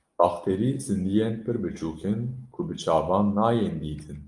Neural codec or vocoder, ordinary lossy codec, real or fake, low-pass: none; Opus, 32 kbps; real; 10.8 kHz